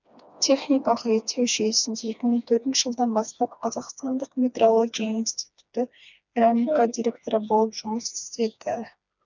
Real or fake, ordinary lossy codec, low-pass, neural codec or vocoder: fake; none; 7.2 kHz; codec, 16 kHz, 2 kbps, FreqCodec, smaller model